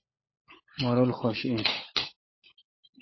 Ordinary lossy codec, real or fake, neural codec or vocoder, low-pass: MP3, 24 kbps; fake; codec, 16 kHz, 16 kbps, FunCodec, trained on LibriTTS, 50 frames a second; 7.2 kHz